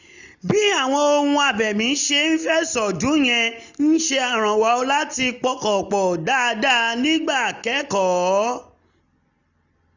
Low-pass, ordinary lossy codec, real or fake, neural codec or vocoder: 7.2 kHz; none; real; none